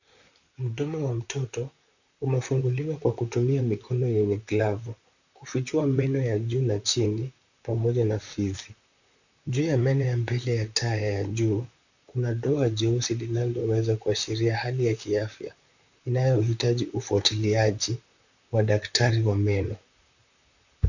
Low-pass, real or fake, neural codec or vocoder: 7.2 kHz; fake; vocoder, 44.1 kHz, 128 mel bands, Pupu-Vocoder